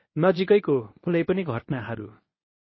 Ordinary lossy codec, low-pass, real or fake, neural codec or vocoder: MP3, 24 kbps; 7.2 kHz; fake; codec, 24 kHz, 0.9 kbps, WavTokenizer, medium speech release version 1